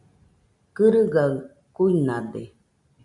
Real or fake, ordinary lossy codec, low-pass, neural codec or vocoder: real; MP3, 96 kbps; 10.8 kHz; none